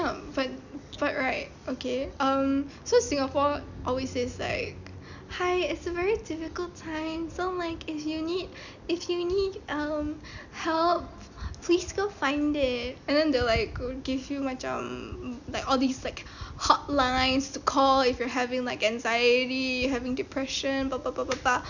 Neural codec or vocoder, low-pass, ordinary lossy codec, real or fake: none; 7.2 kHz; none; real